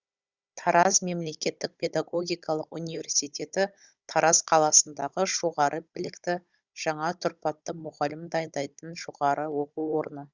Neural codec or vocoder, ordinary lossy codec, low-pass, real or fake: codec, 16 kHz, 16 kbps, FunCodec, trained on Chinese and English, 50 frames a second; Opus, 64 kbps; 7.2 kHz; fake